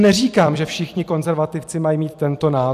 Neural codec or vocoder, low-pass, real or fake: vocoder, 44.1 kHz, 128 mel bands every 256 samples, BigVGAN v2; 14.4 kHz; fake